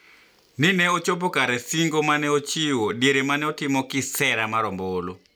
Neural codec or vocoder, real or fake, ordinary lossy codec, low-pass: none; real; none; none